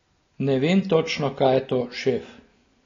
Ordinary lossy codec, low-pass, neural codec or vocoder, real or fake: AAC, 32 kbps; 7.2 kHz; none; real